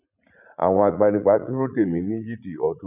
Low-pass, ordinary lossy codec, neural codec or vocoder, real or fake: 3.6 kHz; none; vocoder, 44.1 kHz, 80 mel bands, Vocos; fake